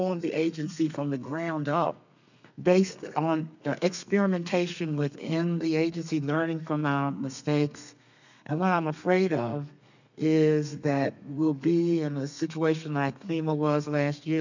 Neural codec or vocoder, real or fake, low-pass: codec, 32 kHz, 1.9 kbps, SNAC; fake; 7.2 kHz